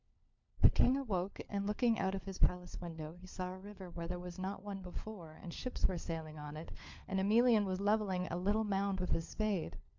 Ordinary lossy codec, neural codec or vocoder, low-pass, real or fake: MP3, 64 kbps; codec, 16 kHz, 4 kbps, FunCodec, trained on LibriTTS, 50 frames a second; 7.2 kHz; fake